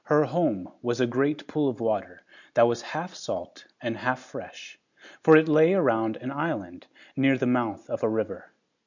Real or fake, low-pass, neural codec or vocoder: real; 7.2 kHz; none